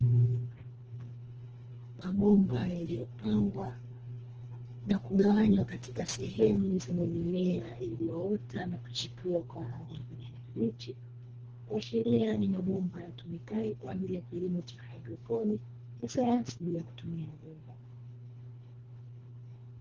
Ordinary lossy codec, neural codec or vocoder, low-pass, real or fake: Opus, 16 kbps; codec, 24 kHz, 1.5 kbps, HILCodec; 7.2 kHz; fake